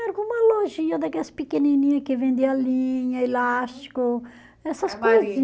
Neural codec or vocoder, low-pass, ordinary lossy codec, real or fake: none; none; none; real